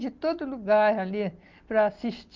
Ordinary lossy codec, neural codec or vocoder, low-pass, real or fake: Opus, 32 kbps; none; 7.2 kHz; real